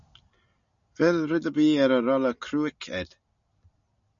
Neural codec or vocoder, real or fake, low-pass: none; real; 7.2 kHz